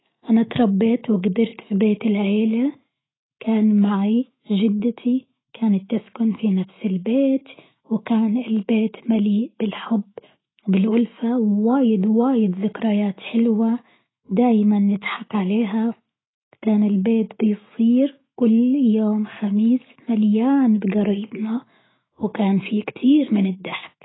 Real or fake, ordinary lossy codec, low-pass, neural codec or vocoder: fake; AAC, 16 kbps; 7.2 kHz; autoencoder, 48 kHz, 128 numbers a frame, DAC-VAE, trained on Japanese speech